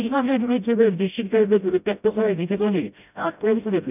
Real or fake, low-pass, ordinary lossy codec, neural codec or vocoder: fake; 3.6 kHz; none; codec, 16 kHz, 0.5 kbps, FreqCodec, smaller model